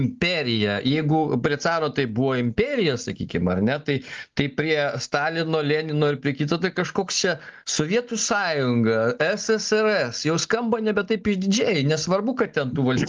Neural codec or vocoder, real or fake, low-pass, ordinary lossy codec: none; real; 7.2 kHz; Opus, 32 kbps